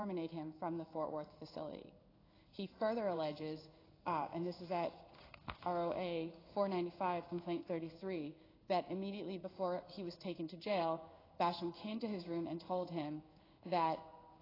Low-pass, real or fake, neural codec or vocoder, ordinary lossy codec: 5.4 kHz; fake; codec, 16 kHz in and 24 kHz out, 1 kbps, XY-Tokenizer; AAC, 24 kbps